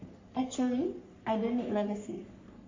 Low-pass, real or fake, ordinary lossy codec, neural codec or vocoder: 7.2 kHz; fake; MP3, 48 kbps; codec, 44.1 kHz, 3.4 kbps, Pupu-Codec